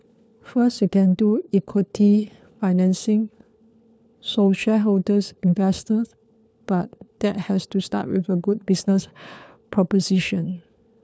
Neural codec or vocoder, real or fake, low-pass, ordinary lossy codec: codec, 16 kHz, 4 kbps, FunCodec, trained on LibriTTS, 50 frames a second; fake; none; none